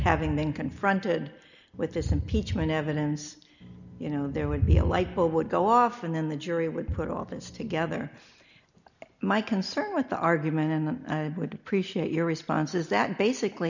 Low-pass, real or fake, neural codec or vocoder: 7.2 kHz; real; none